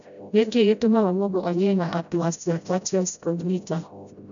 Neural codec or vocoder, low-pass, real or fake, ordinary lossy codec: codec, 16 kHz, 0.5 kbps, FreqCodec, smaller model; 7.2 kHz; fake; none